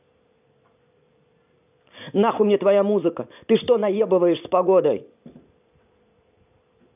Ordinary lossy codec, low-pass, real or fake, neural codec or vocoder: none; 3.6 kHz; fake; vocoder, 44.1 kHz, 128 mel bands every 512 samples, BigVGAN v2